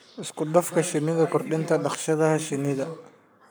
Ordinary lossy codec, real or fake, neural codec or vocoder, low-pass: none; fake; codec, 44.1 kHz, 7.8 kbps, Pupu-Codec; none